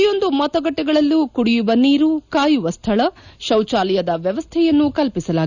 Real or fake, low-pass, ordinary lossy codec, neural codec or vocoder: real; 7.2 kHz; none; none